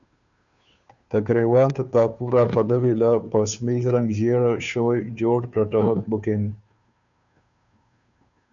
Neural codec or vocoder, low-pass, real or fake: codec, 16 kHz, 2 kbps, FunCodec, trained on Chinese and English, 25 frames a second; 7.2 kHz; fake